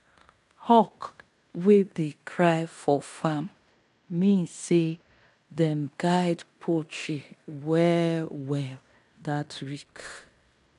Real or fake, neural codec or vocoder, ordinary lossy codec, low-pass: fake; codec, 16 kHz in and 24 kHz out, 0.9 kbps, LongCat-Audio-Codec, fine tuned four codebook decoder; none; 10.8 kHz